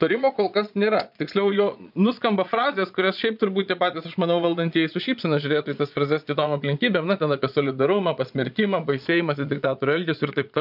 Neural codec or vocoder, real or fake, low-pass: vocoder, 22.05 kHz, 80 mel bands, Vocos; fake; 5.4 kHz